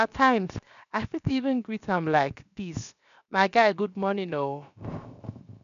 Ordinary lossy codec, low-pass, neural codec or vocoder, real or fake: none; 7.2 kHz; codec, 16 kHz, 0.7 kbps, FocalCodec; fake